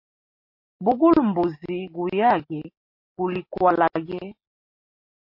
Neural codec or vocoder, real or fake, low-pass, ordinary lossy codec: none; real; 5.4 kHz; MP3, 32 kbps